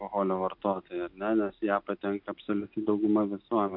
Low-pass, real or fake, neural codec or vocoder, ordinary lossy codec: 5.4 kHz; real; none; AAC, 48 kbps